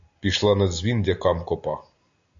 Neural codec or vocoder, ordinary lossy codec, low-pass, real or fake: none; AAC, 64 kbps; 7.2 kHz; real